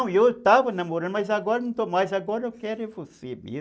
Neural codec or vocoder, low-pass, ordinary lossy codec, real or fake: none; none; none; real